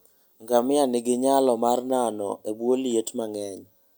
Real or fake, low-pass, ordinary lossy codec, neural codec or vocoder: real; none; none; none